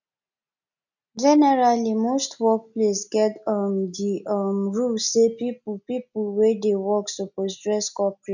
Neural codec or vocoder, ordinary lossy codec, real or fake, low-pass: none; none; real; 7.2 kHz